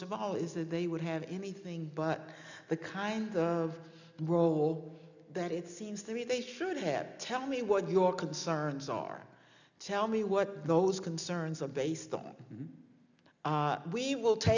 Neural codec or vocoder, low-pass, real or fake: none; 7.2 kHz; real